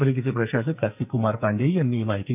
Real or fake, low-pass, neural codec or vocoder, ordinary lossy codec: fake; 3.6 kHz; codec, 44.1 kHz, 2.6 kbps, SNAC; none